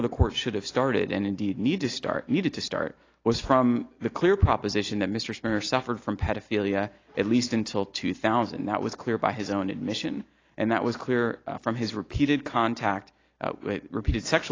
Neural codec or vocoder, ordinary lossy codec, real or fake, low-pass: none; AAC, 32 kbps; real; 7.2 kHz